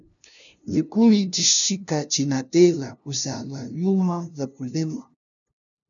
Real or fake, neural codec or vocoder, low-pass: fake; codec, 16 kHz, 0.5 kbps, FunCodec, trained on LibriTTS, 25 frames a second; 7.2 kHz